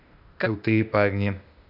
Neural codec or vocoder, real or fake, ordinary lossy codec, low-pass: codec, 16 kHz, 0.8 kbps, ZipCodec; fake; none; 5.4 kHz